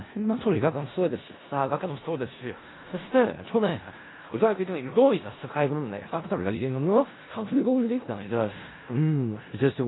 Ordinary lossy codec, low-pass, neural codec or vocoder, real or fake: AAC, 16 kbps; 7.2 kHz; codec, 16 kHz in and 24 kHz out, 0.4 kbps, LongCat-Audio-Codec, four codebook decoder; fake